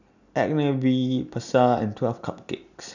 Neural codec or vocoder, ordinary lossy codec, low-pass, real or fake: none; none; 7.2 kHz; real